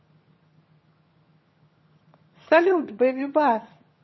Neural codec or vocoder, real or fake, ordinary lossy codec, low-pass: vocoder, 22.05 kHz, 80 mel bands, HiFi-GAN; fake; MP3, 24 kbps; 7.2 kHz